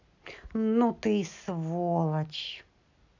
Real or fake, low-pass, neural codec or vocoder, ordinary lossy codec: real; 7.2 kHz; none; none